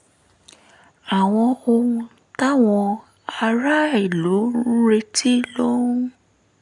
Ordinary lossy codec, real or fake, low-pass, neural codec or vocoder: none; real; 10.8 kHz; none